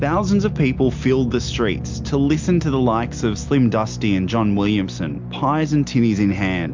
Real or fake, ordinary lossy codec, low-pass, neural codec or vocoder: real; MP3, 64 kbps; 7.2 kHz; none